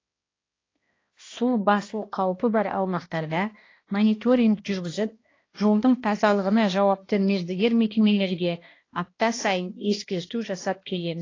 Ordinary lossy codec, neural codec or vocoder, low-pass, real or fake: AAC, 32 kbps; codec, 16 kHz, 1 kbps, X-Codec, HuBERT features, trained on balanced general audio; 7.2 kHz; fake